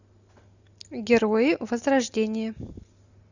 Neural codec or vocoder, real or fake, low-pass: none; real; 7.2 kHz